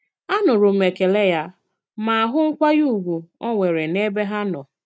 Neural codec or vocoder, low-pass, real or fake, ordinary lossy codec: none; none; real; none